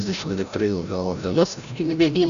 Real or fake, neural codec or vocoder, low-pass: fake; codec, 16 kHz, 0.5 kbps, FreqCodec, larger model; 7.2 kHz